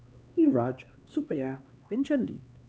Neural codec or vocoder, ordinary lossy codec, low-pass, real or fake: codec, 16 kHz, 2 kbps, X-Codec, HuBERT features, trained on LibriSpeech; none; none; fake